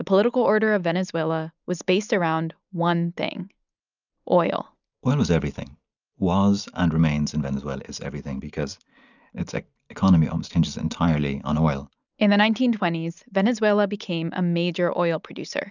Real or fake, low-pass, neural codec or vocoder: real; 7.2 kHz; none